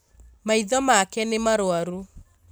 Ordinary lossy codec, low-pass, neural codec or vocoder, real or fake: none; none; none; real